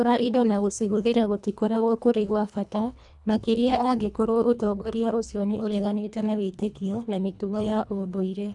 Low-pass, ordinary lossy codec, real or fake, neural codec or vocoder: 10.8 kHz; none; fake; codec, 24 kHz, 1.5 kbps, HILCodec